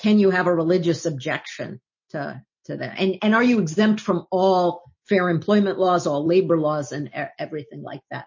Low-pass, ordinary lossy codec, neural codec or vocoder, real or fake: 7.2 kHz; MP3, 32 kbps; none; real